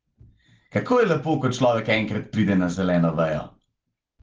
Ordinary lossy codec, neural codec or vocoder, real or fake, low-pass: Opus, 16 kbps; none; real; 7.2 kHz